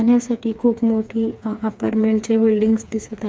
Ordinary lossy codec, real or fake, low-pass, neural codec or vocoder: none; fake; none; codec, 16 kHz, 4 kbps, FreqCodec, smaller model